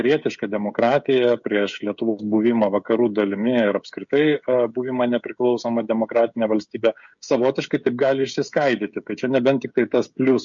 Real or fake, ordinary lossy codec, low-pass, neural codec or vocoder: fake; MP3, 48 kbps; 7.2 kHz; codec, 16 kHz, 16 kbps, FreqCodec, smaller model